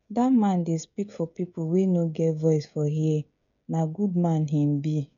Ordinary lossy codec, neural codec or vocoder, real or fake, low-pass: none; codec, 16 kHz, 16 kbps, FreqCodec, smaller model; fake; 7.2 kHz